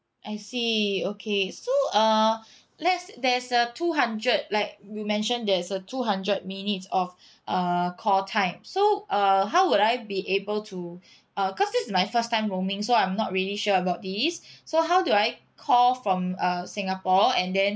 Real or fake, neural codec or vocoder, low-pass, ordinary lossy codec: real; none; none; none